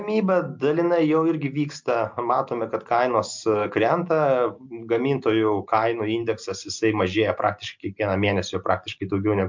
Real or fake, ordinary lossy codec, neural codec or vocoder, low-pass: real; MP3, 64 kbps; none; 7.2 kHz